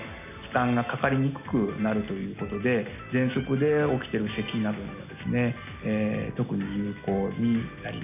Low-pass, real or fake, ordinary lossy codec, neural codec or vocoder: 3.6 kHz; real; none; none